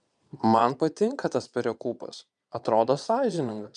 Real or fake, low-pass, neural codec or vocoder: fake; 9.9 kHz; vocoder, 22.05 kHz, 80 mel bands, Vocos